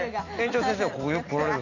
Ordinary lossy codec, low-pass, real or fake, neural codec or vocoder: none; 7.2 kHz; real; none